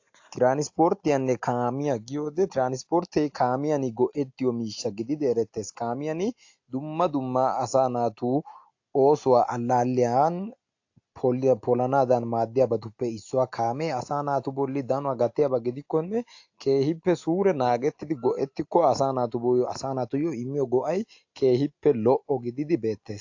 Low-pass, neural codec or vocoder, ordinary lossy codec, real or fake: 7.2 kHz; none; AAC, 48 kbps; real